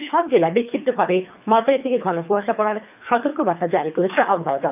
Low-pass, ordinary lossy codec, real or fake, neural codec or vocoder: 3.6 kHz; AAC, 32 kbps; fake; codec, 24 kHz, 3 kbps, HILCodec